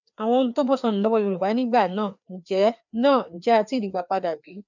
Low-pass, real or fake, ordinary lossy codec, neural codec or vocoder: 7.2 kHz; fake; none; codec, 16 kHz, 2 kbps, FreqCodec, larger model